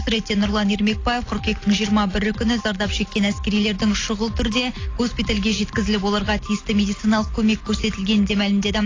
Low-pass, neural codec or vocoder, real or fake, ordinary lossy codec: 7.2 kHz; none; real; AAC, 32 kbps